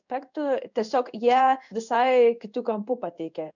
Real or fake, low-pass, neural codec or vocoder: fake; 7.2 kHz; codec, 16 kHz in and 24 kHz out, 1 kbps, XY-Tokenizer